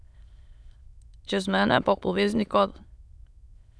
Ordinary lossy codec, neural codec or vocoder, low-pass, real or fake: none; autoencoder, 22.05 kHz, a latent of 192 numbers a frame, VITS, trained on many speakers; none; fake